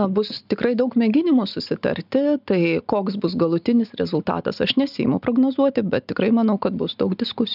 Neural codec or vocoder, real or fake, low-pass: none; real; 5.4 kHz